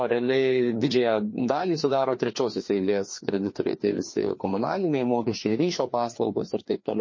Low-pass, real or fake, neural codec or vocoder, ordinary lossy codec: 7.2 kHz; fake; codec, 16 kHz, 2 kbps, FreqCodec, larger model; MP3, 32 kbps